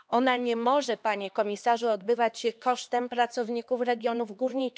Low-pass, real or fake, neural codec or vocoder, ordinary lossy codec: none; fake; codec, 16 kHz, 2 kbps, X-Codec, HuBERT features, trained on LibriSpeech; none